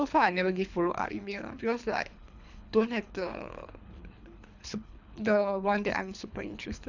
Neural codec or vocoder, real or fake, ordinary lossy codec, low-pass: codec, 24 kHz, 3 kbps, HILCodec; fake; none; 7.2 kHz